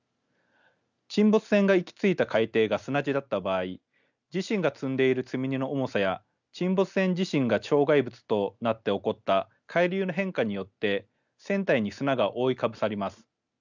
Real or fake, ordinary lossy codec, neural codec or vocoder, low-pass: real; none; none; 7.2 kHz